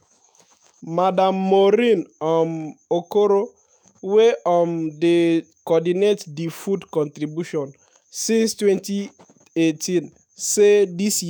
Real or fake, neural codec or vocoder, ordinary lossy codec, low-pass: fake; autoencoder, 48 kHz, 128 numbers a frame, DAC-VAE, trained on Japanese speech; none; none